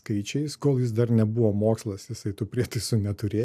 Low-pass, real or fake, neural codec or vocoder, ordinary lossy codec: 14.4 kHz; real; none; AAC, 96 kbps